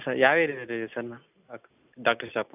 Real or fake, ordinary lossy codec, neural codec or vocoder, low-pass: real; none; none; 3.6 kHz